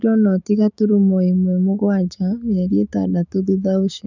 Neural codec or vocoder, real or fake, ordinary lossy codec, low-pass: codec, 16 kHz, 6 kbps, DAC; fake; none; 7.2 kHz